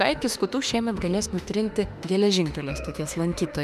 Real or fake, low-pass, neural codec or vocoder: fake; 14.4 kHz; autoencoder, 48 kHz, 32 numbers a frame, DAC-VAE, trained on Japanese speech